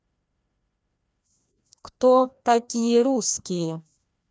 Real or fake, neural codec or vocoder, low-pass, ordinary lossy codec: fake; codec, 16 kHz, 1 kbps, FreqCodec, larger model; none; none